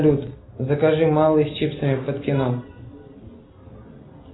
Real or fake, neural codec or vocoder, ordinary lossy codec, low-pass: real; none; AAC, 16 kbps; 7.2 kHz